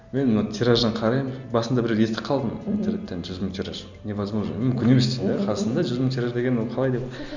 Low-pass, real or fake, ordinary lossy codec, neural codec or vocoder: 7.2 kHz; real; Opus, 64 kbps; none